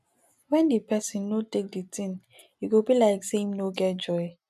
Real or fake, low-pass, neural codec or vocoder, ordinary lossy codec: real; 14.4 kHz; none; none